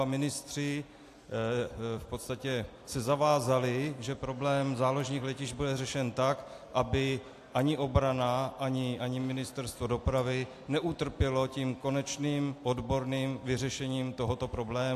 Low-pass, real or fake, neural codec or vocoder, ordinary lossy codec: 14.4 kHz; real; none; AAC, 64 kbps